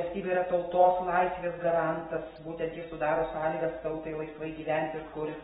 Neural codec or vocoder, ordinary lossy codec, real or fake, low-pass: none; AAC, 16 kbps; real; 7.2 kHz